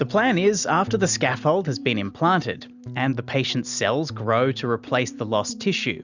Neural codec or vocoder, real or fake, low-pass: none; real; 7.2 kHz